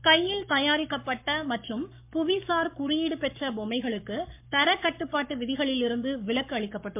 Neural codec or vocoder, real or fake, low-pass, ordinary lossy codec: codec, 44.1 kHz, 7.8 kbps, Pupu-Codec; fake; 3.6 kHz; MP3, 32 kbps